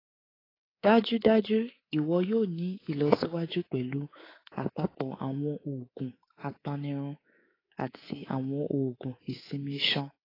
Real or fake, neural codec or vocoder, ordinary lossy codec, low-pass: fake; codec, 24 kHz, 3.1 kbps, DualCodec; AAC, 24 kbps; 5.4 kHz